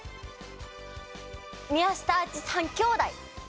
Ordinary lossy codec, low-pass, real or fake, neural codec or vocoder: none; none; real; none